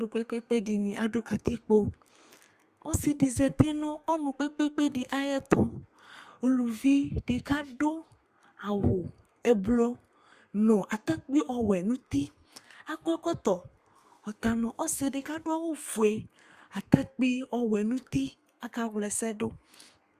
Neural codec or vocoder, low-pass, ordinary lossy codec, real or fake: codec, 32 kHz, 1.9 kbps, SNAC; 14.4 kHz; Opus, 64 kbps; fake